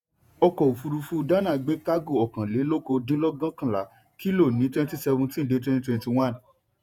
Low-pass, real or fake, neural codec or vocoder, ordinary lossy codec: 19.8 kHz; fake; vocoder, 48 kHz, 128 mel bands, Vocos; none